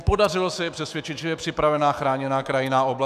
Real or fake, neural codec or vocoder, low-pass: fake; autoencoder, 48 kHz, 128 numbers a frame, DAC-VAE, trained on Japanese speech; 14.4 kHz